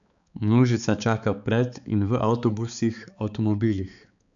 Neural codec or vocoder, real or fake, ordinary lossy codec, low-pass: codec, 16 kHz, 4 kbps, X-Codec, HuBERT features, trained on balanced general audio; fake; none; 7.2 kHz